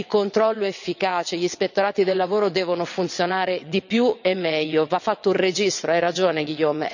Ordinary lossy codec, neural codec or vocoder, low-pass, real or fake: none; vocoder, 22.05 kHz, 80 mel bands, WaveNeXt; 7.2 kHz; fake